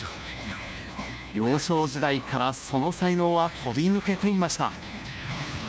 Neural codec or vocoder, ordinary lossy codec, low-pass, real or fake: codec, 16 kHz, 1 kbps, FunCodec, trained on LibriTTS, 50 frames a second; none; none; fake